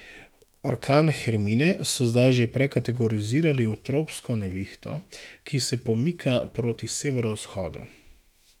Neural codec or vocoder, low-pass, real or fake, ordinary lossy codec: autoencoder, 48 kHz, 32 numbers a frame, DAC-VAE, trained on Japanese speech; 19.8 kHz; fake; none